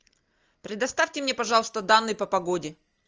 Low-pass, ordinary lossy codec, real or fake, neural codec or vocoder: 7.2 kHz; Opus, 24 kbps; real; none